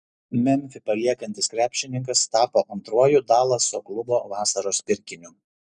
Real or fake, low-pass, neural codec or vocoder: real; 10.8 kHz; none